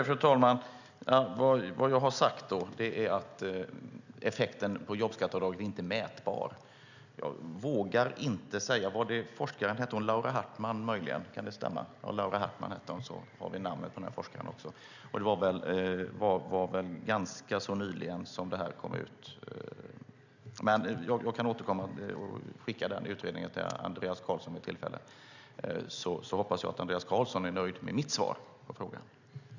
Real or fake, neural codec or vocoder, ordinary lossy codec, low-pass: real; none; none; 7.2 kHz